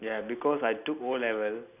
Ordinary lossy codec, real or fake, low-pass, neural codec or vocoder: none; real; 3.6 kHz; none